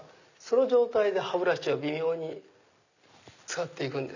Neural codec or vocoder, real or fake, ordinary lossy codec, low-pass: none; real; none; 7.2 kHz